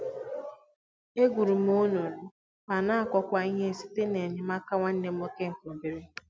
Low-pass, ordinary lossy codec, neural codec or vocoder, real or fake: none; none; none; real